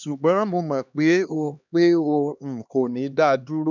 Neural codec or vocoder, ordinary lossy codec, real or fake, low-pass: codec, 16 kHz, 2 kbps, X-Codec, HuBERT features, trained on LibriSpeech; none; fake; 7.2 kHz